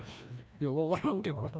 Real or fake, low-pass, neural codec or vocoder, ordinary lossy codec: fake; none; codec, 16 kHz, 1 kbps, FreqCodec, larger model; none